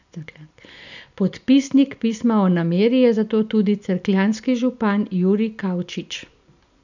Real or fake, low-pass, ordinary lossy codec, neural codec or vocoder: real; 7.2 kHz; none; none